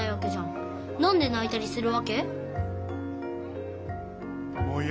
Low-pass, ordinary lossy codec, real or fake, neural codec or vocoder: none; none; real; none